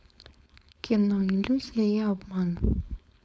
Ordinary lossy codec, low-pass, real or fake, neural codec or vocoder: none; none; fake; codec, 16 kHz, 4.8 kbps, FACodec